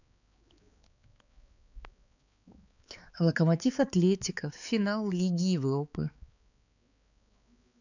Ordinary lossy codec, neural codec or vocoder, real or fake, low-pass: none; codec, 16 kHz, 4 kbps, X-Codec, HuBERT features, trained on balanced general audio; fake; 7.2 kHz